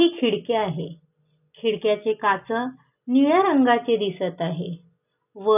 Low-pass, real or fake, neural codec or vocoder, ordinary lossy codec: 3.6 kHz; real; none; none